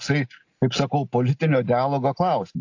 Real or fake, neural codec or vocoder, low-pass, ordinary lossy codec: real; none; 7.2 kHz; AAC, 48 kbps